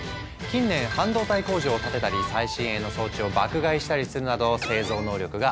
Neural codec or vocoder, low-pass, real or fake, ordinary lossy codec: none; none; real; none